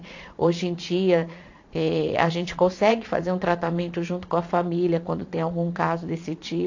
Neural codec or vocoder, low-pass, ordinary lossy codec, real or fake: vocoder, 22.05 kHz, 80 mel bands, Vocos; 7.2 kHz; AAC, 48 kbps; fake